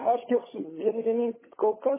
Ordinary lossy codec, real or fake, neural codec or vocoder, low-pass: MP3, 16 kbps; fake; codec, 16 kHz, 16 kbps, FunCodec, trained on LibriTTS, 50 frames a second; 3.6 kHz